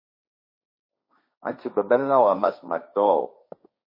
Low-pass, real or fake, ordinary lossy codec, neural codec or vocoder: 5.4 kHz; fake; MP3, 24 kbps; codec, 16 kHz, 1.1 kbps, Voila-Tokenizer